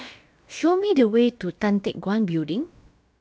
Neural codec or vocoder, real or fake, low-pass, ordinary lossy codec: codec, 16 kHz, about 1 kbps, DyCAST, with the encoder's durations; fake; none; none